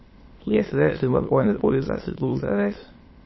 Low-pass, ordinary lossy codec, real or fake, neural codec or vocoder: 7.2 kHz; MP3, 24 kbps; fake; autoencoder, 22.05 kHz, a latent of 192 numbers a frame, VITS, trained on many speakers